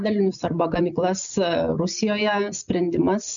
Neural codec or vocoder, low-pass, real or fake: none; 7.2 kHz; real